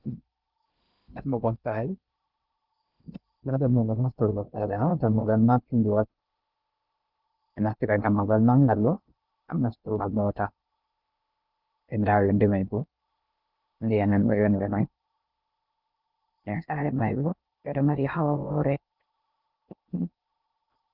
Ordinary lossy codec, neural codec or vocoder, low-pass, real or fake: Opus, 24 kbps; codec, 16 kHz in and 24 kHz out, 0.6 kbps, FocalCodec, streaming, 2048 codes; 5.4 kHz; fake